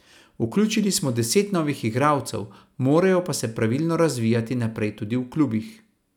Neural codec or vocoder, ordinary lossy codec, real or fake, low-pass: none; none; real; 19.8 kHz